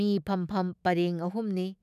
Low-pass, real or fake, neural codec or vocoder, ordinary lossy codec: 14.4 kHz; fake; autoencoder, 48 kHz, 128 numbers a frame, DAC-VAE, trained on Japanese speech; none